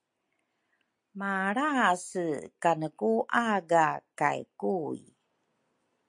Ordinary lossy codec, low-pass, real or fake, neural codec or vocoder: MP3, 48 kbps; 10.8 kHz; real; none